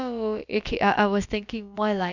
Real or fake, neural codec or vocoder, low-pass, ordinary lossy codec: fake; codec, 16 kHz, about 1 kbps, DyCAST, with the encoder's durations; 7.2 kHz; none